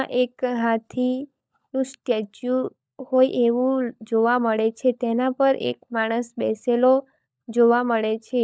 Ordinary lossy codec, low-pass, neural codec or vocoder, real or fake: none; none; codec, 16 kHz, 16 kbps, FunCodec, trained on LibriTTS, 50 frames a second; fake